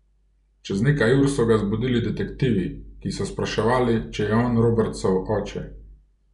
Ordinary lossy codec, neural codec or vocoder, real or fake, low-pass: MP3, 96 kbps; none; real; 10.8 kHz